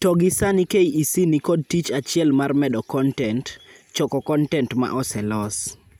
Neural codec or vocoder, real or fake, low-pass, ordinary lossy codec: none; real; none; none